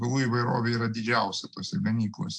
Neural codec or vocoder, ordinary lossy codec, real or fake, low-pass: none; AAC, 64 kbps; real; 9.9 kHz